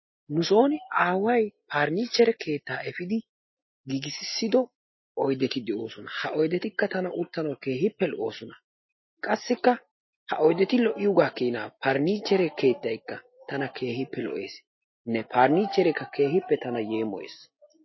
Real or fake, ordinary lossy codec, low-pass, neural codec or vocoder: real; MP3, 24 kbps; 7.2 kHz; none